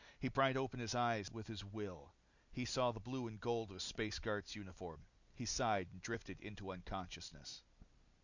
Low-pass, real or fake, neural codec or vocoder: 7.2 kHz; real; none